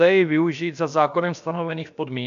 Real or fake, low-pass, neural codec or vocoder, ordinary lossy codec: fake; 7.2 kHz; codec, 16 kHz, about 1 kbps, DyCAST, with the encoder's durations; AAC, 96 kbps